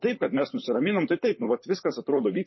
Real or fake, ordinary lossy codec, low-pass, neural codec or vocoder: fake; MP3, 24 kbps; 7.2 kHz; vocoder, 44.1 kHz, 128 mel bands, Pupu-Vocoder